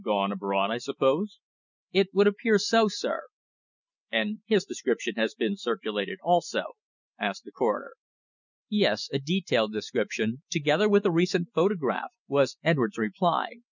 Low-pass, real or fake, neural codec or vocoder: 7.2 kHz; real; none